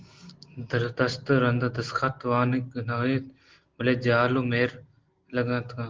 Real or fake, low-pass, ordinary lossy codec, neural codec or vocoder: real; 7.2 kHz; Opus, 16 kbps; none